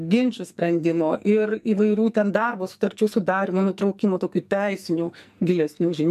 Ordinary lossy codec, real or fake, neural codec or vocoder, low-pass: MP3, 96 kbps; fake; codec, 44.1 kHz, 2.6 kbps, SNAC; 14.4 kHz